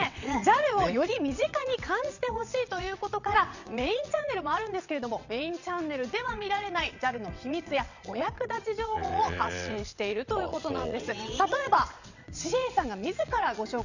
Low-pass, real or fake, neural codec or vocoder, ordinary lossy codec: 7.2 kHz; fake; vocoder, 22.05 kHz, 80 mel bands, WaveNeXt; none